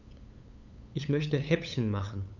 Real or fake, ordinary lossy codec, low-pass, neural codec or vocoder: fake; none; 7.2 kHz; codec, 16 kHz, 8 kbps, FunCodec, trained on LibriTTS, 25 frames a second